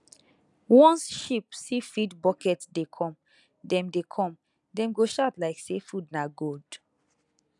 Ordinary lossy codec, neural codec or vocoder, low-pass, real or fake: none; none; 10.8 kHz; real